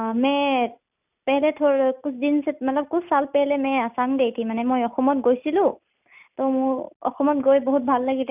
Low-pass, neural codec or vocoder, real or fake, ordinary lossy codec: 3.6 kHz; none; real; none